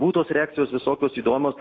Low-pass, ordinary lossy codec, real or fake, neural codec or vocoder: 7.2 kHz; AAC, 32 kbps; real; none